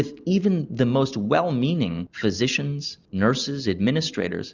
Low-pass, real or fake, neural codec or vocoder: 7.2 kHz; real; none